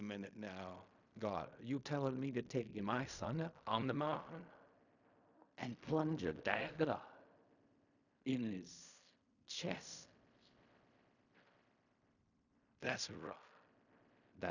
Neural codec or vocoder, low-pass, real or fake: codec, 16 kHz in and 24 kHz out, 0.4 kbps, LongCat-Audio-Codec, fine tuned four codebook decoder; 7.2 kHz; fake